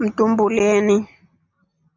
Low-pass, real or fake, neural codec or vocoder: 7.2 kHz; real; none